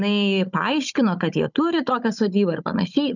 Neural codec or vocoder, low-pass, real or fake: codec, 16 kHz, 16 kbps, FunCodec, trained on Chinese and English, 50 frames a second; 7.2 kHz; fake